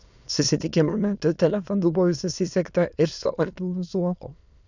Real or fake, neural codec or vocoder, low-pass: fake; autoencoder, 22.05 kHz, a latent of 192 numbers a frame, VITS, trained on many speakers; 7.2 kHz